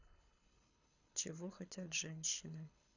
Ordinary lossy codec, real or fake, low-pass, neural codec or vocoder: none; fake; 7.2 kHz; codec, 24 kHz, 6 kbps, HILCodec